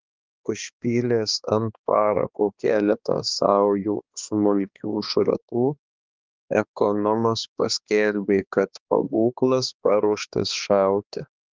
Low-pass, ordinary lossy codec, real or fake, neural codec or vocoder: 7.2 kHz; Opus, 32 kbps; fake; codec, 16 kHz, 2 kbps, X-Codec, HuBERT features, trained on balanced general audio